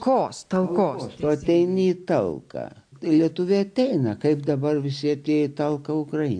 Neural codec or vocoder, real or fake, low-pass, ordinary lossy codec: none; real; 9.9 kHz; AAC, 64 kbps